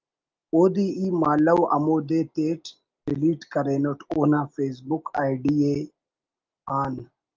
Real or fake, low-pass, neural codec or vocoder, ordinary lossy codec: real; 7.2 kHz; none; Opus, 32 kbps